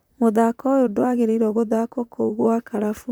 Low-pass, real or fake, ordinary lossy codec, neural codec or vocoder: none; fake; none; vocoder, 44.1 kHz, 128 mel bands, Pupu-Vocoder